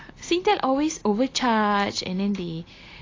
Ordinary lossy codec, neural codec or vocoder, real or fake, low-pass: AAC, 32 kbps; none; real; 7.2 kHz